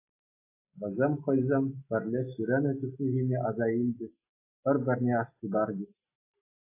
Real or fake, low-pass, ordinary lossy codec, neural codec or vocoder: real; 3.6 kHz; AAC, 24 kbps; none